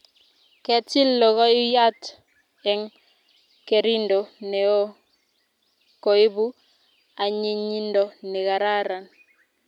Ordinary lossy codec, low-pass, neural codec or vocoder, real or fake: none; 19.8 kHz; none; real